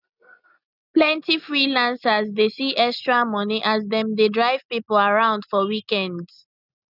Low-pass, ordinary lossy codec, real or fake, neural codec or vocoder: 5.4 kHz; none; real; none